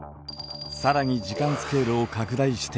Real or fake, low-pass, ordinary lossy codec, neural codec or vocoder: real; none; none; none